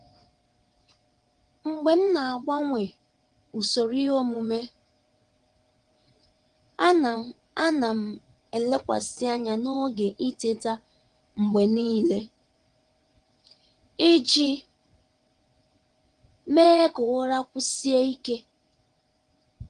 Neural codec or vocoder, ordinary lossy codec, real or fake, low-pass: vocoder, 22.05 kHz, 80 mel bands, WaveNeXt; Opus, 24 kbps; fake; 9.9 kHz